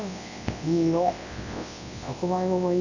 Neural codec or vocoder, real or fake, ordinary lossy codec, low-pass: codec, 24 kHz, 0.9 kbps, WavTokenizer, large speech release; fake; none; 7.2 kHz